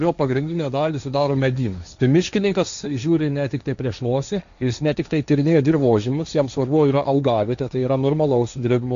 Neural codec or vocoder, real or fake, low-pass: codec, 16 kHz, 1.1 kbps, Voila-Tokenizer; fake; 7.2 kHz